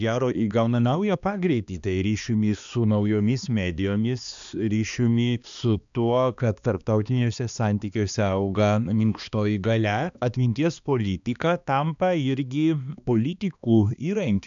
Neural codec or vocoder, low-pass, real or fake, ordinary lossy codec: codec, 16 kHz, 2 kbps, X-Codec, HuBERT features, trained on balanced general audio; 7.2 kHz; fake; MP3, 96 kbps